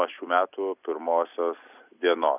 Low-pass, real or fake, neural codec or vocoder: 3.6 kHz; real; none